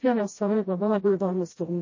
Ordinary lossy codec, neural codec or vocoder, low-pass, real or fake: MP3, 32 kbps; codec, 16 kHz, 0.5 kbps, FreqCodec, smaller model; 7.2 kHz; fake